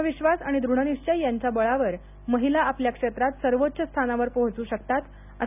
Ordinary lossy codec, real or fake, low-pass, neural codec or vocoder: none; real; 3.6 kHz; none